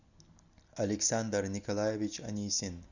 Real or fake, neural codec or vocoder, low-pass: real; none; 7.2 kHz